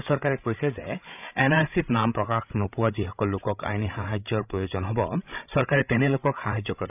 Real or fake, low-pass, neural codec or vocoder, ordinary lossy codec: fake; 3.6 kHz; codec, 16 kHz, 16 kbps, FreqCodec, larger model; none